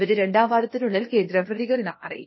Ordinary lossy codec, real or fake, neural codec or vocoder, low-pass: MP3, 24 kbps; fake; codec, 16 kHz, 0.8 kbps, ZipCodec; 7.2 kHz